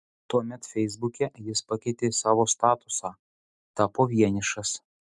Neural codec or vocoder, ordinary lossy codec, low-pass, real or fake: none; MP3, 96 kbps; 10.8 kHz; real